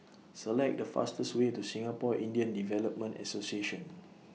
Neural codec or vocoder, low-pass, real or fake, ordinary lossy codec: none; none; real; none